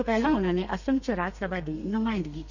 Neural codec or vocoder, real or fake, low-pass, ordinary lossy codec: codec, 32 kHz, 1.9 kbps, SNAC; fake; 7.2 kHz; none